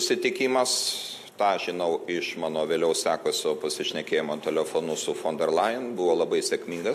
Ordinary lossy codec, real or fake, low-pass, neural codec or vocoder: MP3, 64 kbps; real; 14.4 kHz; none